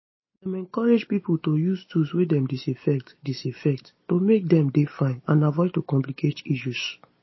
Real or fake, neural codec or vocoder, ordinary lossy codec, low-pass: real; none; MP3, 24 kbps; 7.2 kHz